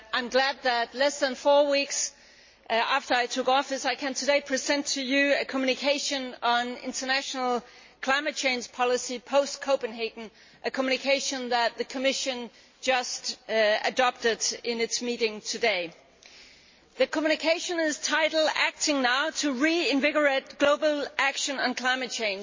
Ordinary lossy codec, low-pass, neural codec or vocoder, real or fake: MP3, 32 kbps; 7.2 kHz; none; real